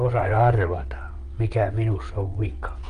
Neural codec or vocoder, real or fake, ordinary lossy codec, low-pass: vocoder, 22.05 kHz, 80 mel bands, WaveNeXt; fake; Opus, 32 kbps; 9.9 kHz